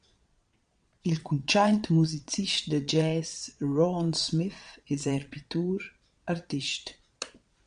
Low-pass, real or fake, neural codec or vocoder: 9.9 kHz; fake; vocoder, 22.05 kHz, 80 mel bands, Vocos